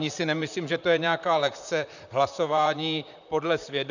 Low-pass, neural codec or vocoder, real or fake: 7.2 kHz; vocoder, 44.1 kHz, 128 mel bands, Pupu-Vocoder; fake